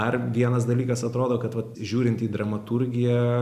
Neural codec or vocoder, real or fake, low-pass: none; real; 14.4 kHz